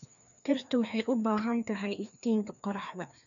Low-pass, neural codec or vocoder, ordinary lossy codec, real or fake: 7.2 kHz; codec, 16 kHz, 2 kbps, FreqCodec, larger model; none; fake